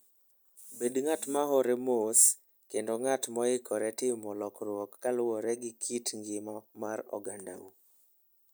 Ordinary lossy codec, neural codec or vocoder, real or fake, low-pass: none; none; real; none